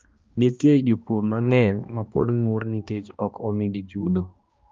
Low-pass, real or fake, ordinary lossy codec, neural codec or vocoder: 7.2 kHz; fake; Opus, 32 kbps; codec, 16 kHz, 1 kbps, X-Codec, HuBERT features, trained on balanced general audio